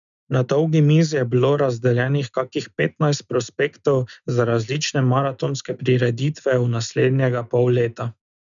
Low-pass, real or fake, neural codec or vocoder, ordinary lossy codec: 7.2 kHz; real; none; none